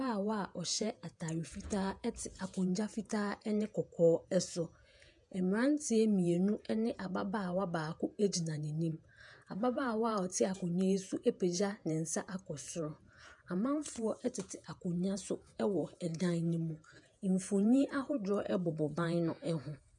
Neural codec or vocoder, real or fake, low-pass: vocoder, 48 kHz, 128 mel bands, Vocos; fake; 10.8 kHz